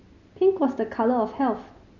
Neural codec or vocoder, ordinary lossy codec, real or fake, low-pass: none; none; real; 7.2 kHz